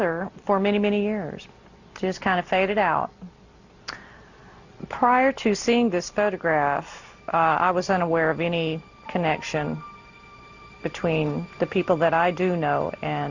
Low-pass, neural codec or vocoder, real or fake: 7.2 kHz; none; real